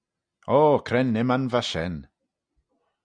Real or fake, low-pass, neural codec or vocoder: real; 9.9 kHz; none